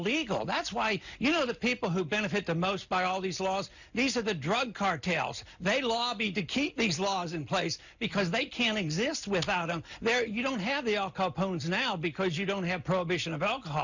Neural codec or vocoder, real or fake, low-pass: none; real; 7.2 kHz